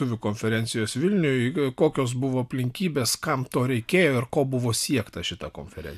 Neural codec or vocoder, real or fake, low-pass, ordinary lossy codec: none; real; 14.4 kHz; MP3, 96 kbps